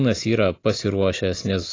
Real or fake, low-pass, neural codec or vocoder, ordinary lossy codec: real; 7.2 kHz; none; AAC, 32 kbps